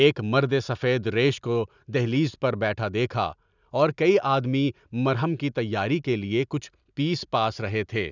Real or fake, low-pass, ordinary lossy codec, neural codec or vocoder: real; 7.2 kHz; none; none